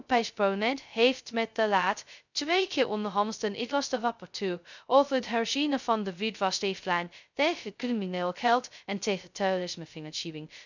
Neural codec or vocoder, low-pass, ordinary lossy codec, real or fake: codec, 16 kHz, 0.2 kbps, FocalCodec; 7.2 kHz; none; fake